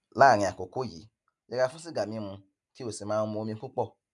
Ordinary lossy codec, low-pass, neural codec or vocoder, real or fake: none; 10.8 kHz; none; real